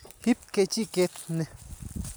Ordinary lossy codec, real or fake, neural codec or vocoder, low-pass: none; real; none; none